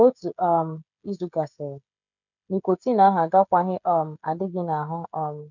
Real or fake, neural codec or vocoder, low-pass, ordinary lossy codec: fake; codec, 16 kHz, 16 kbps, FreqCodec, smaller model; 7.2 kHz; none